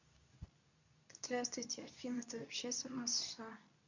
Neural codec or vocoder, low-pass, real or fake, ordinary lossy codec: codec, 24 kHz, 0.9 kbps, WavTokenizer, medium speech release version 2; 7.2 kHz; fake; none